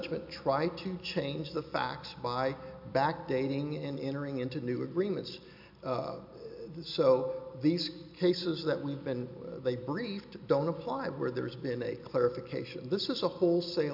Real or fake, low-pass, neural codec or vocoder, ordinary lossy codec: real; 5.4 kHz; none; MP3, 48 kbps